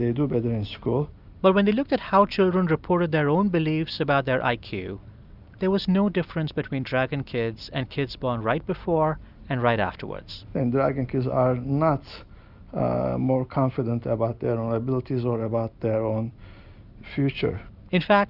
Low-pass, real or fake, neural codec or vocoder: 5.4 kHz; real; none